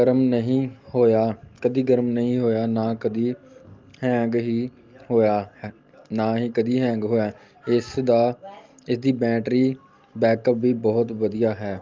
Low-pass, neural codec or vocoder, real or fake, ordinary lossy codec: 7.2 kHz; none; real; Opus, 32 kbps